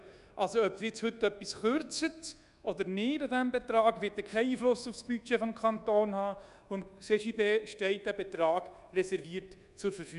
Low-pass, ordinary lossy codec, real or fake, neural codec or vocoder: 10.8 kHz; none; fake; codec, 24 kHz, 1.2 kbps, DualCodec